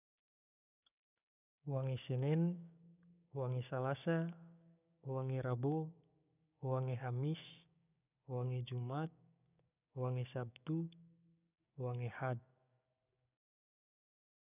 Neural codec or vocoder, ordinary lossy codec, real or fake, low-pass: codec, 16 kHz, 6 kbps, DAC; none; fake; 3.6 kHz